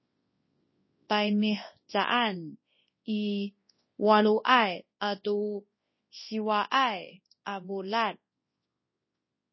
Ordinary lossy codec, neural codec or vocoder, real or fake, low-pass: MP3, 24 kbps; codec, 24 kHz, 0.9 kbps, WavTokenizer, large speech release; fake; 7.2 kHz